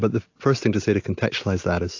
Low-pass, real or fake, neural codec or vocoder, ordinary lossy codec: 7.2 kHz; real; none; AAC, 48 kbps